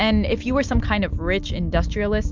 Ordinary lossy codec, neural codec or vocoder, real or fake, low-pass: MP3, 64 kbps; none; real; 7.2 kHz